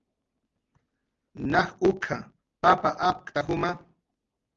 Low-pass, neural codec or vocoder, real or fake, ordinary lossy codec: 7.2 kHz; none; real; Opus, 32 kbps